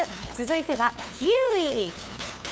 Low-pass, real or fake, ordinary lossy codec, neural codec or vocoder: none; fake; none; codec, 16 kHz, 2 kbps, FunCodec, trained on LibriTTS, 25 frames a second